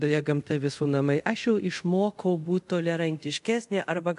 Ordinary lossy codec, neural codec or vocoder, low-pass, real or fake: MP3, 64 kbps; codec, 24 kHz, 0.5 kbps, DualCodec; 10.8 kHz; fake